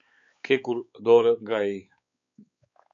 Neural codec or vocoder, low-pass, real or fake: codec, 16 kHz, 4 kbps, X-Codec, WavLM features, trained on Multilingual LibriSpeech; 7.2 kHz; fake